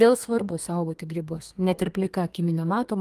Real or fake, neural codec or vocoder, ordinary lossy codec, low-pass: fake; codec, 32 kHz, 1.9 kbps, SNAC; Opus, 32 kbps; 14.4 kHz